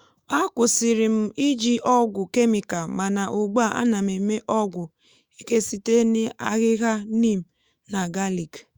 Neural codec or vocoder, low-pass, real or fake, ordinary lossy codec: autoencoder, 48 kHz, 128 numbers a frame, DAC-VAE, trained on Japanese speech; 19.8 kHz; fake; Opus, 64 kbps